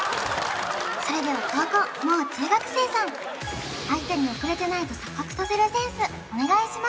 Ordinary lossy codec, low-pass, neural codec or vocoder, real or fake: none; none; none; real